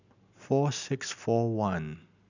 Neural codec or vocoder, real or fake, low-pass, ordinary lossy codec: none; real; 7.2 kHz; none